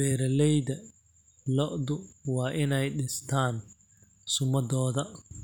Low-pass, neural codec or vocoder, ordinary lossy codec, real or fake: 19.8 kHz; none; none; real